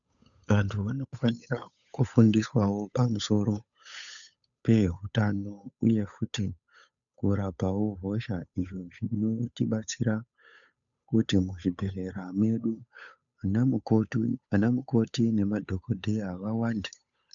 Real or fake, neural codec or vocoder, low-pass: fake; codec, 16 kHz, 8 kbps, FunCodec, trained on Chinese and English, 25 frames a second; 7.2 kHz